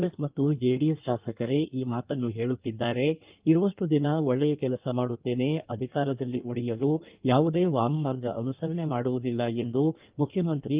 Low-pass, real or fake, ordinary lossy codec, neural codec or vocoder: 3.6 kHz; fake; Opus, 32 kbps; codec, 16 kHz in and 24 kHz out, 1.1 kbps, FireRedTTS-2 codec